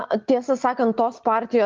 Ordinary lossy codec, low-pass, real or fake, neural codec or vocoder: Opus, 32 kbps; 7.2 kHz; real; none